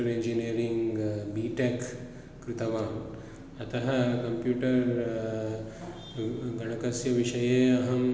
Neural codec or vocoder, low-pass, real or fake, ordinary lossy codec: none; none; real; none